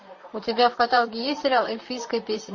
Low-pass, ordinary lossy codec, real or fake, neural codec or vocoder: 7.2 kHz; MP3, 32 kbps; fake; vocoder, 44.1 kHz, 128 mel bands, Pupu-Vocoder